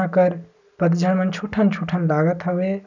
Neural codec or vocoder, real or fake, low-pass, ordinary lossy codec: none; real; 7.2 kHz; none